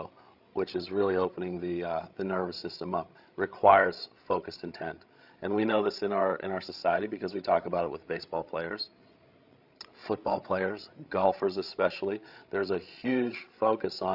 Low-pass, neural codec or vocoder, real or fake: 5.4 kHz; codec, 16 kHz, 16 kbps, FreqCodec, larger model; fake